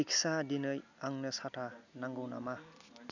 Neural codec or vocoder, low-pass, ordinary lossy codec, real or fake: none; 7.2 kHz; none; real